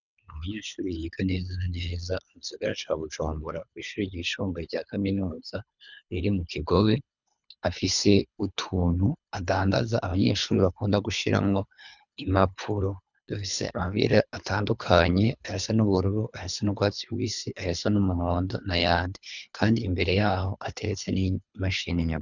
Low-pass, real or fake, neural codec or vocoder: 7.2 kHz; fake; codec, 24 kHz, 3 kbps, HILCodec